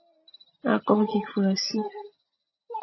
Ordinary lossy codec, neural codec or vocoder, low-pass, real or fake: MP3, 24 kbps; none; 7.2 kHz; real